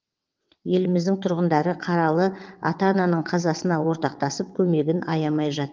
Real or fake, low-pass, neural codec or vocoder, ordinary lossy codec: fake; 7.2 kHz; vocoder, 22.05 kHz, 80 mel bands, WaveNeXt; Opus, 32 kbps